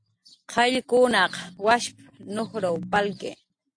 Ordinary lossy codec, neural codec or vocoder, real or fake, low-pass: AAC, 48 kbps; none; real; 9.9 kHz